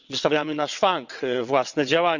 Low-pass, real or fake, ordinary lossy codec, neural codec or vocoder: 7.2 kHz; fake; none; vocoder, 22.05 kHz, 80 mel bands, WaveNeXt